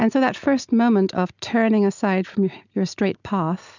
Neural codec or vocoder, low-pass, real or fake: none; 7.2 kHz; real